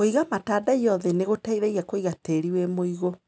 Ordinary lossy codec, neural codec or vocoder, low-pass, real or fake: none; none; none; real